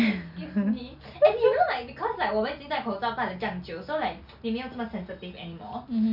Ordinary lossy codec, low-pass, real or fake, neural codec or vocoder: none; 5.4 kHz; real; none